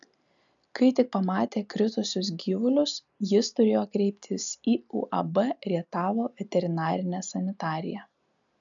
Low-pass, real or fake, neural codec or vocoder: 7.2 kHz; real; none